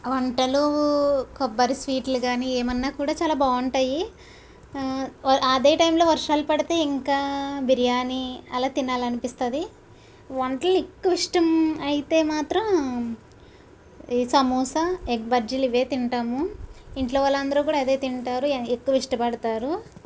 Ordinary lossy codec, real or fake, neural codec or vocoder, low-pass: none; real; none; none